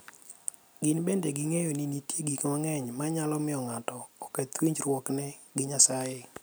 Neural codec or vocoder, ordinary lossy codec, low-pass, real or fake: none; none; none; real